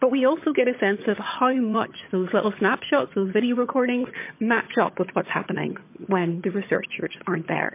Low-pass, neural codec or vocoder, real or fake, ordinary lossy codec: 3.6 kHz; vocoder, 22.05 kHz, 80 mel bands, HiFi-GAN; fake; MP3, 24 kbps